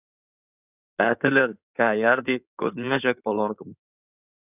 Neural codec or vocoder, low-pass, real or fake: codec, 16 kHz in and 24 kHz out, 2.2 kbps, FireRedTTS-2 codec; 3.6 kHz; fake